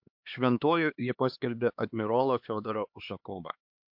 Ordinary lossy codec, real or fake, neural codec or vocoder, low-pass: MP3, 48 kbps; fake; codec, 16 kHz, 2 kbps, X-Codec, HuBERT features, trained on LibriSpeech; 5.4 kHz